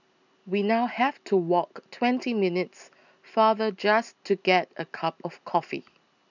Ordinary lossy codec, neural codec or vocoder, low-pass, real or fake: none; none; 7.2 kHz; real